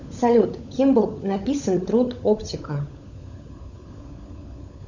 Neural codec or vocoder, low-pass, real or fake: codec, 16 kHz, 16 kbps, FunCodec, trained on LibriTTS, 50 frames a second; 7.2 kHz; fake